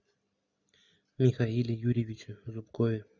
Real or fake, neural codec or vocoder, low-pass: real; none; 7.2 kHz